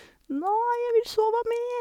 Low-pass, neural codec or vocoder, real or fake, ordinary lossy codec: 19.8 kHz; none; real; MP3, 96 kbps